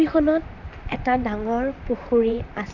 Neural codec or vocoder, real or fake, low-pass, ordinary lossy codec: vocoder, 44.1 kHz, 128 mel bands, Pupu-Vocoder; fake; 7.2 kHz; none